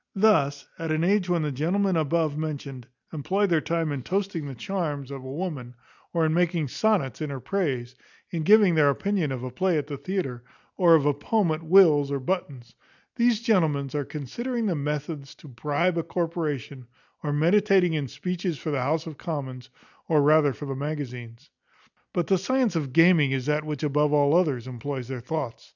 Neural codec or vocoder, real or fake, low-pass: none; real; 7.2 kHz